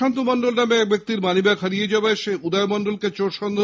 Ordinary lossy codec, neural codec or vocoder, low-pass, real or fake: none; none; none; real